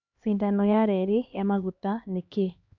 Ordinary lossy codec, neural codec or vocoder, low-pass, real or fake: AAC, 48 kbps; codec, 16 kHz, 2 kbps, X-Codec, HuBERT features, trained on LibriSpeech; 7.2 kHz; fake